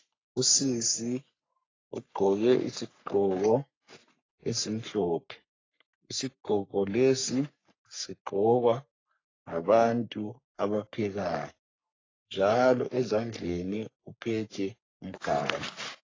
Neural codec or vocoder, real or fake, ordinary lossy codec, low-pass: codec, 44.1 kHz, 3.4 kbps, Pupu-Codec; fake; AAC, 32 kbps; 7.2 kHz